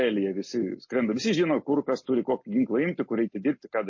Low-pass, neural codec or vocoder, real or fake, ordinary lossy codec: 7.2 kHz; none; real; MP3, 32 kbps